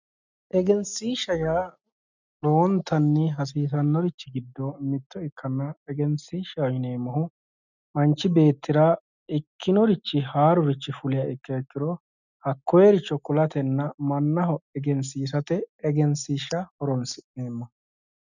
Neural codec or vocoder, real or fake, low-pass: none; real; 7.2 kHz